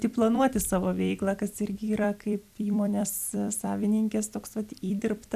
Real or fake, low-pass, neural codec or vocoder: fake; 14.4 kHz; vocoder, 44.1 kHz, 128 mel bands every 256 samples, BigVGAN v2